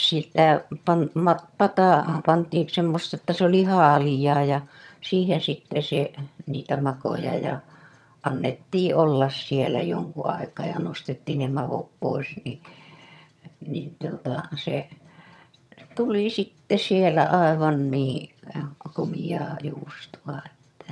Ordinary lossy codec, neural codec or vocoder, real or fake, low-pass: none; vocoder, 22.05 kHz, 80 mel bands, HiFi-GAN; fake; none